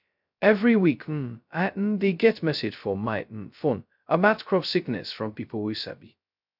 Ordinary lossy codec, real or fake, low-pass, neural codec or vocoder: none; fake; 5.4 kHz; codec, 16 kHz, 0.2 kbps, FocalCodec